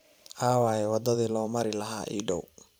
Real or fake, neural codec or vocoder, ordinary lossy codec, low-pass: fake; vocoder, 44.1 kHz, 128 mel bands every 512 samples, BigVGAN v2; none; none